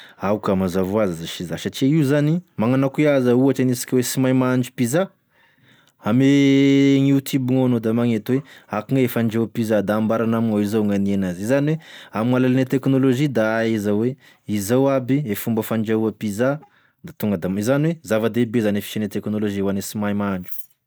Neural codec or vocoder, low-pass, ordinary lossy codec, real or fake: none; none; none; real